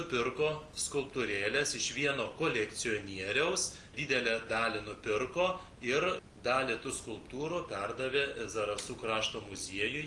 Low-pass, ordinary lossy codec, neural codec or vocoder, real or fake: 10.8 kHz; Opus, 24 kbps; none; real